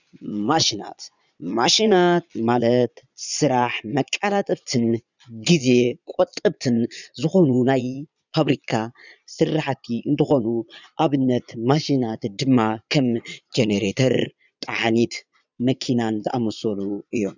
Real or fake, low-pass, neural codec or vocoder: fake; 7.2 kHz; vocoder, 22.05 kHz, 80 mel bands, Vocos